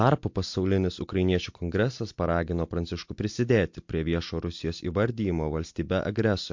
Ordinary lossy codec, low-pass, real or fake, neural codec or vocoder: MP3, 48 kbps; 7.2 kHz; real; none